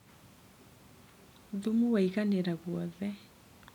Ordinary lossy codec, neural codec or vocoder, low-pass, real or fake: none; none; 19.8 kHz; real